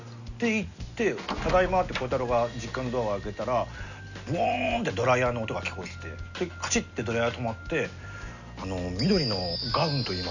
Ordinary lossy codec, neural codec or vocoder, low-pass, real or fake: none; none; 7.2 kHz; real